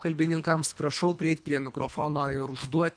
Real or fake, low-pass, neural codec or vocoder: fake; 9.9 kHz; codec, 24 kHz, 1.5 kbps, HILCodec